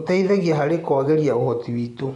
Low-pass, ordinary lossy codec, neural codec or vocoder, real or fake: 10.8 kHz; none; vocoder, 24 kHz, 100 mel bands, Vocos; fake